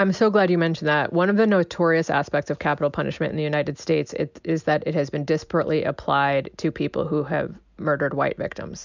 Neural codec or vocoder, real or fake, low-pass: none; real; 7.2 kHz